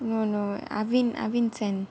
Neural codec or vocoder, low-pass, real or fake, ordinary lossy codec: none; none; real; none